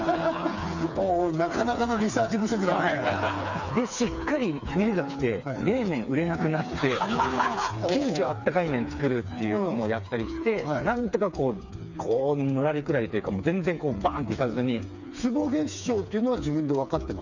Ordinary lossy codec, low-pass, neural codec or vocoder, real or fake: none; 7.2 kHz; codec, 16 kHz, 4 kbps, FreqCodec, smaller model; fake